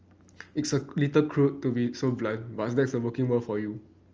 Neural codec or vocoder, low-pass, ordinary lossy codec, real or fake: none; 7.2 kHz; Opus, 24 kbps; real